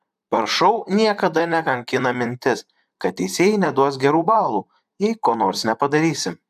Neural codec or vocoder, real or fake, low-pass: vocoder, 44.1 kHz, 128 mel bands, Pupu-Vocoder; fake; 14.4 kHz